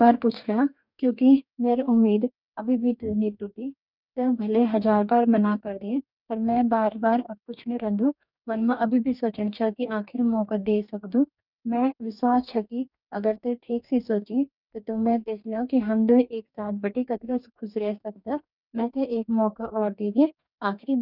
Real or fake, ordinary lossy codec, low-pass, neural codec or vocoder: fake; none; 5.4 kHz; codec, 44.1 kHz, 2.6 kbps, DAC